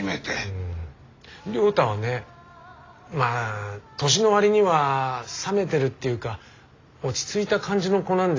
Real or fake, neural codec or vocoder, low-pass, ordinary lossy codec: real; none; 7.2 kHz; AAC, 32 kbps